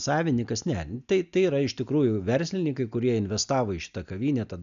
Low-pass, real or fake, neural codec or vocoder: 7.2 kHz; real; none